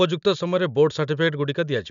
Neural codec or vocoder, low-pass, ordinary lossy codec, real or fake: none; 7.2 kHz; none; real